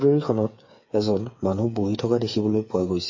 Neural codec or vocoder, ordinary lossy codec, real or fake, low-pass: codec, 16 kHz, 8 kbps, FreqCodec, smaller model; MP3, 32 kbps; fake; 7.2 kHz